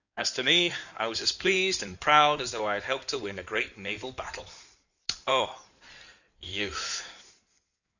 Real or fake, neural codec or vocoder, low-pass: fake; codec, 16 kHz in and 24 kHz out, 2.2 kbps, FireRedTTS-2 codec; 7.2 kHz